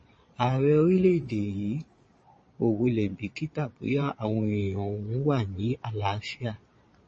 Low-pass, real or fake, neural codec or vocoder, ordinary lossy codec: 9.9 kHz; fake; vocoder, 22.05 kHz, 80 mel bands, Vocos; MP3, 32 kbps